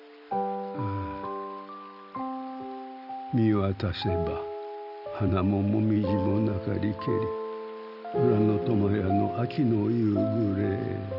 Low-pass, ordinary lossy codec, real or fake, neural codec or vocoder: 5.4 kHz; none; real; none